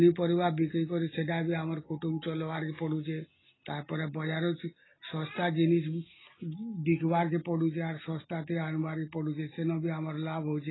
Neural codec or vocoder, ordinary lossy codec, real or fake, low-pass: none; AAC, 16 kbps; real; 7.2 kHz